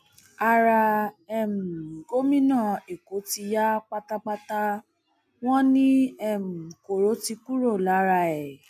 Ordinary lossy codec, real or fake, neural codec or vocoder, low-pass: MP3, 96 kbps; real; none; 14.4 kHz